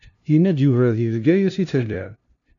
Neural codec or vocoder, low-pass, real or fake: codec, 16 kHz, 0.5 kbps, FunCodec, trained on LibriTTS, 25 frames a second; 7.2 kHz; fake